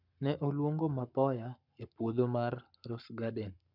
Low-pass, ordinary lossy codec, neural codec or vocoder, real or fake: 5.4 kHz; none; codec, 44.1 kHz, 7.8 kbps, Pupu-Codec; fake